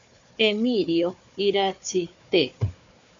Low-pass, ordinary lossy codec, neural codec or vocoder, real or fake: 7.2 kHz; MP3, 64 kbps; codec, 16 kHz, 4 kbps, FunCodec, trained on Chinese and English, 50 frames a second; fake